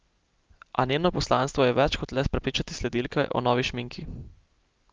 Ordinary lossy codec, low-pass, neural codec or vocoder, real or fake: Opus, 24 kbps; 7.2 kHz; none; real